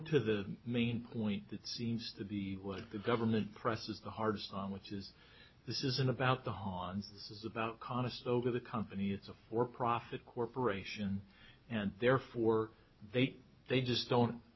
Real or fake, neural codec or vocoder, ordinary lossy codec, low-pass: real; none; MP3, 24 kbps; 7.2 kHz